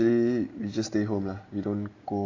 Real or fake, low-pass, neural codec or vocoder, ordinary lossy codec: real; 7.2 kHz; none; AAC, 32 kbps